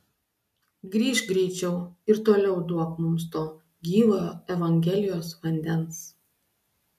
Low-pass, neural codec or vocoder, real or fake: 14.4 kHz; none; real